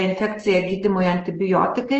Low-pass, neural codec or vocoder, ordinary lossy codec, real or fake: 7.2 kHz; none; Opus, 16 kbps; real